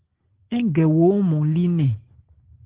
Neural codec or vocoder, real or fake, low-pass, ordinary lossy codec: none; real; 3.6 kHz; Opus, 16 kbps